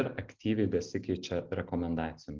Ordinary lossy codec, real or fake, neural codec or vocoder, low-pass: Opus, 16 kbps; real; none; 7.2 kHz